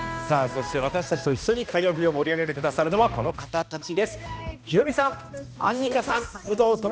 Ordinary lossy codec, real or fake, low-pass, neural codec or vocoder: none; fake; none; codec, 16 kHz, 1 kbps, X-Codec, HuBERT features, trained on balanced general audio